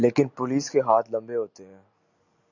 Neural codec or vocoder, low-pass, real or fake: none; 7.2 kHz; real